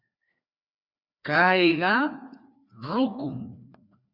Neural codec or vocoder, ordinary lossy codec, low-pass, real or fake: codec, 16 kHz, 2 kbps, FreqCodec, larger model; Opus, 64 kbps; 5.4 kHz; fake